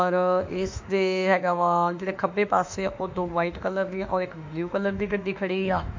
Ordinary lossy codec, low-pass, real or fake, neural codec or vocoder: MP3, 48 kbps; 7.2 kHz; fake; autoencoder, 48 kHz, 32 numbers a frame, DAC-VAE, trained on Japanese speech